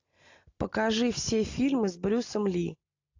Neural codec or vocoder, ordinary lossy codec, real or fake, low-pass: none; AAC, 48 kbps; real; 7.2 kHz